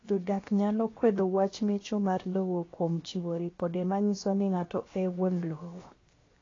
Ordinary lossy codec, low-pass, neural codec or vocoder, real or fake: AAC, 32 kbps; 7.2 kHz; codec, 16 kHz, 0.7 kbps, FocalCodec; fake